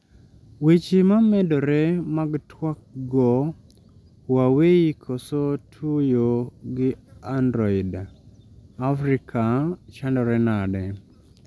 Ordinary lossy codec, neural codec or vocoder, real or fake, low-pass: none; none; real; none